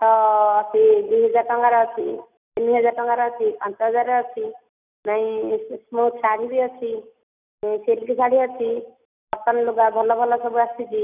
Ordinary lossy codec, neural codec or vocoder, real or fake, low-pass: none; none; real; 3.6 kHz